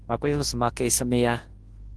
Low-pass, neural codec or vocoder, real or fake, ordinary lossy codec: 10.8 kHz; codec, 24 kHz, 0.9 kbps, WavTokenizer, large speech release; fake; Opus, 16 kbps